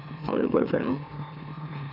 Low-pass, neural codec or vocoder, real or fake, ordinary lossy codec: 5.4 kHz; autoencoder, 44.1 kHz, a latent of 192 numbers a frame, MeloTTS; fake; none